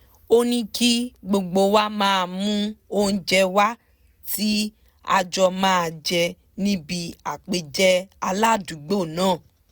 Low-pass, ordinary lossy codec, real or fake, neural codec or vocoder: none; none; real; none